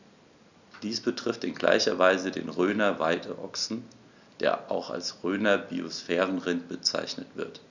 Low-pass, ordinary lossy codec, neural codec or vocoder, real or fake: 7.2 kHz; none; none; real